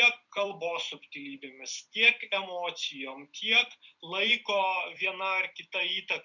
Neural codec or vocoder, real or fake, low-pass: vocoder, 44.1 kHz, 128 mel bands every 256 samples, BigVGAN v2; fake; 7.2 kHz